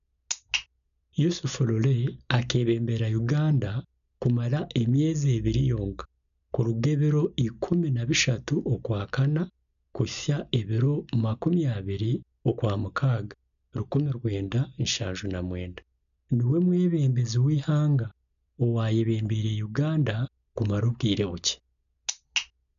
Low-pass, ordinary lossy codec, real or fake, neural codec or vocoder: 7.2 kHz; none; real; none